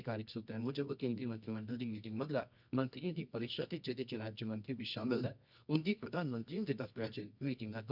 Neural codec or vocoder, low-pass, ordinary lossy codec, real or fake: codec, 24 kHz, 0.9 kbps, WavTokenizer, medium music audio release; 5.4 kHz; none; fake